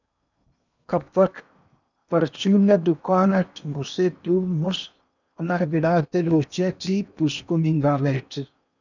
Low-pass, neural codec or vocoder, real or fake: 7.2 kHz; codec, 16 kHz in and 24 kHz out, 0.8 kbps, FocalCodec, streaming, 65536 codes; fake